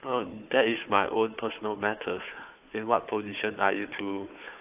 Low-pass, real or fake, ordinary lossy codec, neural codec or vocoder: 3.6 kHz; fake; AAC, 32 kbps; codec, 16 kHz, 4 kbps, FunCodec, trained on Chinese and English, 50 frames a second